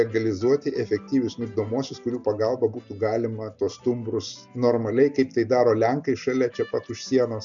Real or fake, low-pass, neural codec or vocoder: real; 7.2 kHz; none